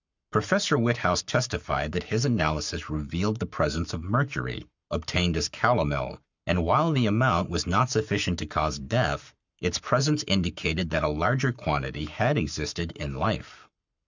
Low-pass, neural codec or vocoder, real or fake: 7.2 kHz; codec, 44.1 kHz, 7.8 kbps, Pupu-Codec; fake